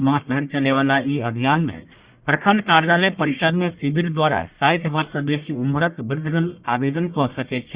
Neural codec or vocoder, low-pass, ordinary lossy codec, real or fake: codec, 44.1 kHz, 1.7 kbps, Pupu-Codec; 3.6 kHz; Opus, 64 kbps; fake